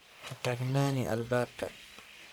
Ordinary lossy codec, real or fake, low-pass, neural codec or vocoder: none; fake; none; codec, 44.1 kHz, 1.7 kbps, Pupu-Codec